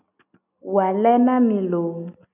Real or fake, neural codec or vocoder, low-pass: real; none; 3.6 kHz